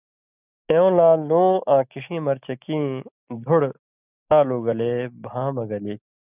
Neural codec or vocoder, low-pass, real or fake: none; 3.6 kHz; real